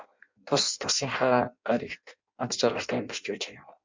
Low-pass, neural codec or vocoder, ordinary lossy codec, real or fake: 7.2 kHz; codec, 16 kHz in and 24 kHz out, 0.6 kbps, FireRedTTS-2 codec; MP3, 64 kbps; fake